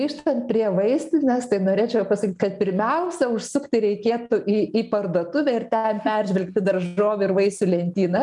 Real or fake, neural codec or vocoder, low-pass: real; none; 10.8 kHz